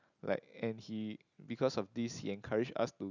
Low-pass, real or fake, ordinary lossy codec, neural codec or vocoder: 7.2 kHz; real; none; none